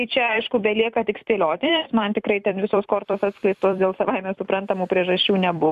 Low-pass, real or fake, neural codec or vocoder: 14.4 kHz; fake; vocoder, 44.1 kHz, 128 mel bands every 256 samples, BigVGAN v2